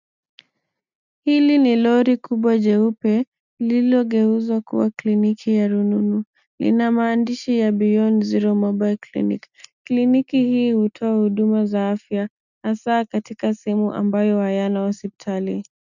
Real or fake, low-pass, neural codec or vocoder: real; 7.2 kHz; none